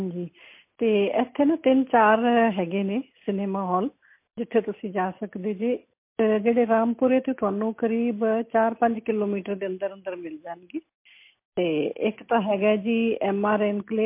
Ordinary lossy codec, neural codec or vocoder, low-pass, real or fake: MP3, 24 kbps; none; 3.6 kHz; real